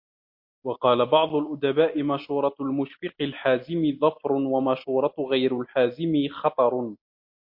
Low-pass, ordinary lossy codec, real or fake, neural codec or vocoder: 5.4 kHz; MP3, 32 kbps; real; none